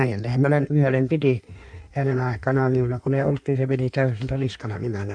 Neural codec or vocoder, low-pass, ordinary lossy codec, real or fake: codec, 16 kHz in and 24 kHz out, 1.1 kbps, FireRedTTS-2 codec; 9.9 kHz; none; fake